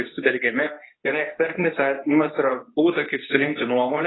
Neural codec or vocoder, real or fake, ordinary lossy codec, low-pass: codec, 24 kHz, 0.9 kbps, WavTokenizer, medium speech release version 2; fake; AAC, 16 kbps; 7.2 kHz